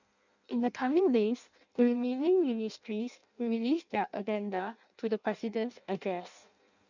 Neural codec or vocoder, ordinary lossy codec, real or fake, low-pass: codec, 16 kHz in and 24 kHz out, 0.6 kbps, FireRedTTS-2 codec; none; fake; 7.2 kHz